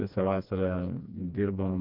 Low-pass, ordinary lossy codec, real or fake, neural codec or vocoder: 5.4 kHz; MP3, 48 kbps; fake; codec, 16 kHz, 2 kbps, FreqCodec, smaller model